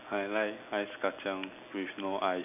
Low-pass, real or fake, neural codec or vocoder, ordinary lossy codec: 3.6 kHz; real; none; none